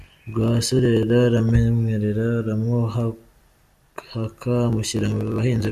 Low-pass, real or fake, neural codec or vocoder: 14.4 kHz; real; none